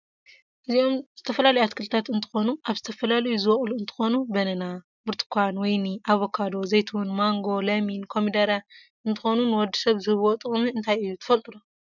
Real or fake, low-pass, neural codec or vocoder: real; 7.2 kHz; none